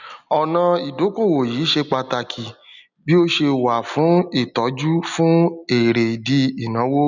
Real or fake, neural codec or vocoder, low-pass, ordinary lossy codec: real; none; 7.2 kHz; none